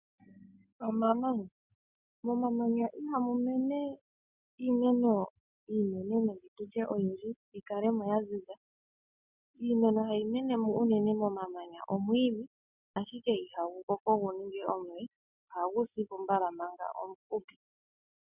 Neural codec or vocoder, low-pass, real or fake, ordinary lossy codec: none; 3.6 kHz; real; Opus, 64 kbps